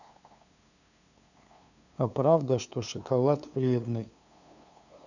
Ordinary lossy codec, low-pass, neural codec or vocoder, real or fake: none; 7.2 kHz; codec, 16 kHz, 2 kbps, FunCodec, trained on LibriTTS, 25 frames a second; fake